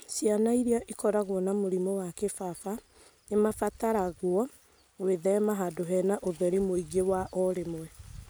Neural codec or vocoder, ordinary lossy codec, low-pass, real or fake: none; none; none; real